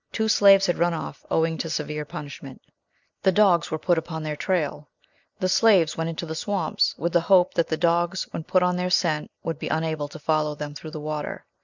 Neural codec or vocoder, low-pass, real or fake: none; 7.2 kHz; real